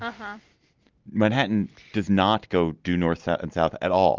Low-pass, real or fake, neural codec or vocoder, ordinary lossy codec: 7.2 kHz; real; none; Opus, 32 kbps